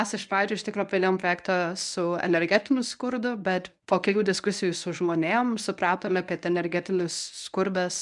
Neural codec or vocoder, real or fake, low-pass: codec, 24 kHz, 0.9 kbps, WavTokenizer, medium speech release version 1; fake; 10.8 kHz